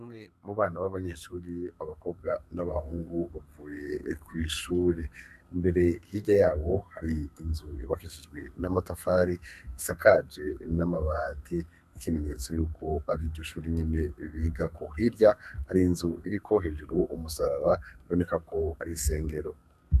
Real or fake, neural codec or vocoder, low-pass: fake; codec, 32 kHz, 1.9 kbps, SNAC; 14.4 kHz